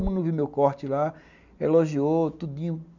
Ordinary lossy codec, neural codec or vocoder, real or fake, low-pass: none; none; real; 7.2 kHz